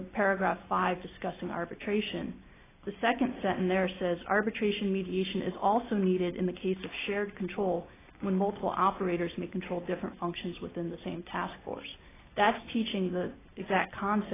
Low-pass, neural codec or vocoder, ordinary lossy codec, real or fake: 3.6 kHz; none; AAC, 16 kbps; real